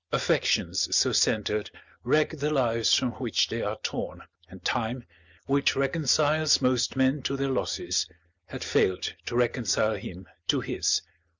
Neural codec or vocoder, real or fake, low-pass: none; real; 7.2 kHz